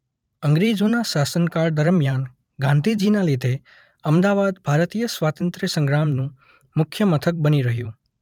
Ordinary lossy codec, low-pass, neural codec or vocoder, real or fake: none; 19.8 kHz; vocoder, 44.1 kHz, 128 mel bands every 512 samples, BigVGAN v2; fake